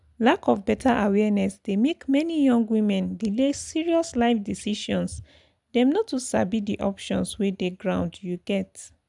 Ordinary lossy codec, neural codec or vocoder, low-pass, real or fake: none; none; 10.8 kHz; real